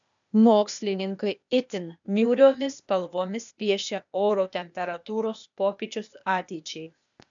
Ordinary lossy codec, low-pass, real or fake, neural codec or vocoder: MP3, 96 kbps; 7.2 kHz; fake; codec, 16 kHz, 0.8 kbps, ZipCodec